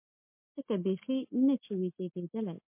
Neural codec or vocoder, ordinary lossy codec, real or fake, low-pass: none; MP3, 24 kbps; real; 3.6 kHz